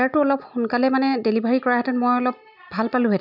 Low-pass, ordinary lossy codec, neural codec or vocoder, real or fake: 5.4 kHz; none; none; real